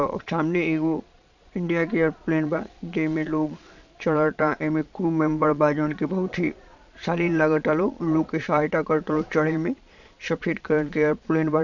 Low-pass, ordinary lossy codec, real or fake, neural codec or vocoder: 7.2 kHz; none; fake; vocoder, 22.05 kHz, 80 mel bands, Vocos